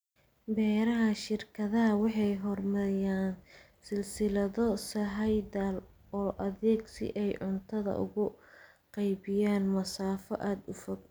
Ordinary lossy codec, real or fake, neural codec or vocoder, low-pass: none; real; none; none